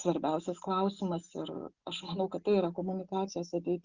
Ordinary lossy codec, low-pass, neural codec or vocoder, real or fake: Opus, 64 kbps; 7.2 kHz; none; real